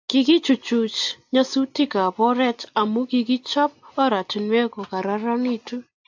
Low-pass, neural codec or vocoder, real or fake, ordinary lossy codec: 7.2 kHz; none; real; AAC, 48 kbps